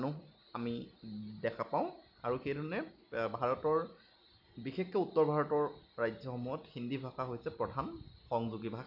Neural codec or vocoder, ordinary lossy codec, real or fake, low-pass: none; none; real; 5.4 kHz